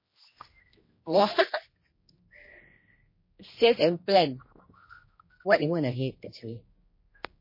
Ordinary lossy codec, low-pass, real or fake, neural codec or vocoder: MP3, 24 kbps; 5.4 kHz; fake; codec, 16 kHz, 1 kbps, X-Codec, HuBERT features, trained on general audio